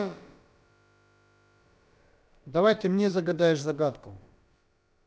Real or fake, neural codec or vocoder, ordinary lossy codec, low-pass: fake; codec, 16 kHz, about 1 kbps, DyCAST, with the encoder's durations; none; none